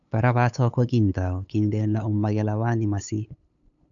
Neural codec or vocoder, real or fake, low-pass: codec, 16 kHz, 8 kbps, FunCodec, trained on LibriTTS, 25 frames a second; fake; 7.2 kHz